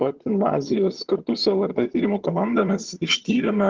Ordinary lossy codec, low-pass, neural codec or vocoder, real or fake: Opus, 16 kbps; 7.2 kHz; vocoder, 22.05 kHz, 80 mel bands, HiFi-GAN; fake